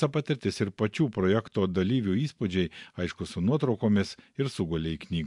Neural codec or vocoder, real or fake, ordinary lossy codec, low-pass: vocoder, 44.1 kHz, 128 mel bands every 512 samples, BigVGAN v2; fake; MP3, 64 kbps; 10.8 kHz